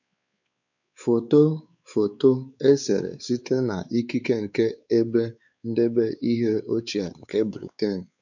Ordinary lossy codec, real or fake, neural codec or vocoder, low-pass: none; fake; codec, 16 kHz, 4 kbps, X-Codec, WavLM features, trained on Multilingual LibriSpeech; 7.2 kHz